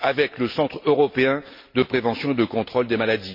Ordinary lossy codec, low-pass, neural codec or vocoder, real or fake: MP3, 32 kbps; 5.4 kHz; none; real